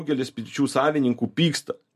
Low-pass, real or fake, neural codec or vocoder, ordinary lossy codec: 14.4 kHz; real; none; MP3, 64 kbps